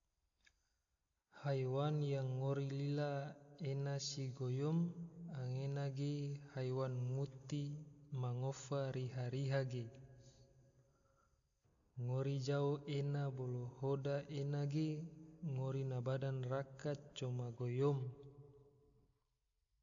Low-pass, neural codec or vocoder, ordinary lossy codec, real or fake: 7.2 kHz; none; none; real